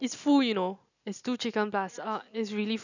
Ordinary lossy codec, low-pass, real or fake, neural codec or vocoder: none; 7.2 kHz; real; none